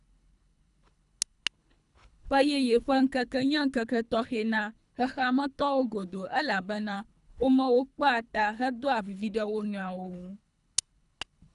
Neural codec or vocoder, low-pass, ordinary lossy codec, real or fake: codec, 24 kHz, 3 kbps, HILCodec; 10.8 kHz; none; fake